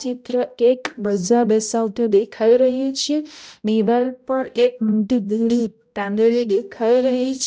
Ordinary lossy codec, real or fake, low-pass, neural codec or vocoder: none; fake; none; codec, 16 kHz, 0.5 kbps, X-Codec, HuBERT features, trained on balanced general audio